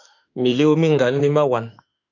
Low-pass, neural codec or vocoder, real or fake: 7.2 kHz; autoencoder, 48 kHz, 32 numbers a frame, DAC-VAE, trained on Japanese speech; fake